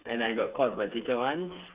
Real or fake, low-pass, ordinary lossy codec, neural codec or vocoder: fake; 3.6 kHz; Opus, 64 kbps; codec, 16 kHz, 4 kbps, FreqCodec, smaller model